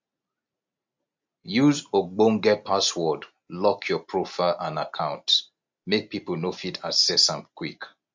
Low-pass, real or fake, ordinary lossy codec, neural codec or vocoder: 7.2 kHz; real; MP3, 48 kbps; none